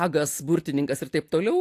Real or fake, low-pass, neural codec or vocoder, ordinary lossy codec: real; 14.4 kHz; none; AAC, 64 kbps